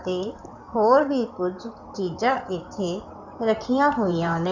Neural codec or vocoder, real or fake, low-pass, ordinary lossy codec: codec, 16 kHz in and 24 kHz out, 2.2 kbps, FireRedTTS-2 codec; fake; 7.2 kHz; Opus, 64 kbps